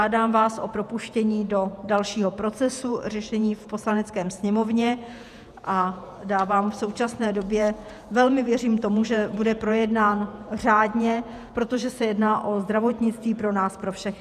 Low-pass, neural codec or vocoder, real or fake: 14.4 kHz; vocoder, 48 kHz, 128 mel bands, Vocos; fake